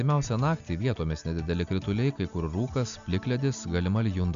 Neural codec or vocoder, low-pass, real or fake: none; 7.2 kHz; real